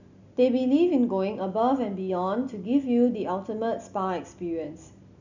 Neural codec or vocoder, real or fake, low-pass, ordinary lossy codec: none; real; 7.2 kHz; none